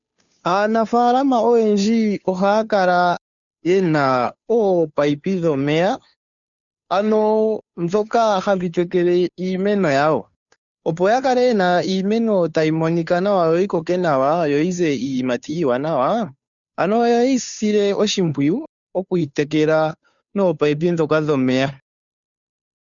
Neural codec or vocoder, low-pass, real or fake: codec, 16 kHz, 2 kbps, FunCodec, trained on Chinese and English, 25 frames a second; 7.2 kHz; fake